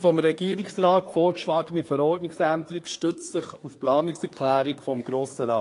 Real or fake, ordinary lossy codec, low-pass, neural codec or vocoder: fake; AAC, 48 kbps; 10.8 kHz; codec, 24 kHz, 1 kbps, SNAC